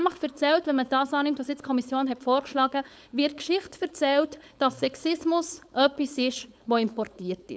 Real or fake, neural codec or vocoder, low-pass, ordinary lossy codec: fake; codec, 16 kHz, 4.8 kbps, FACodec; none; none